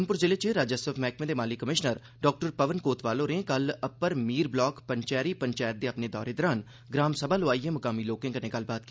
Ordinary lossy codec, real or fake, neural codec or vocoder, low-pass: none; real; none; none